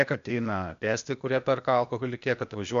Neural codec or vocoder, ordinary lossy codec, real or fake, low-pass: codec, 16 kHz, 0.8 kbps, ZipCodec; MP3, 64 kbps; fake; 7.2 kHz